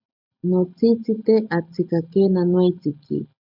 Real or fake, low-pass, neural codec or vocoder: real; 5.4 kHz; none